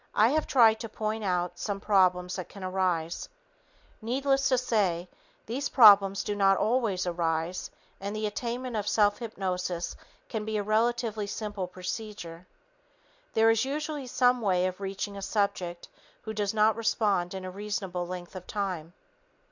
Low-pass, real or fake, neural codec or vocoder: 7.2 kHz; real; none